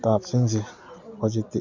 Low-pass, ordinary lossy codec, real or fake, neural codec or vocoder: 7.2 kHz; Opus, 64 kbps; real; none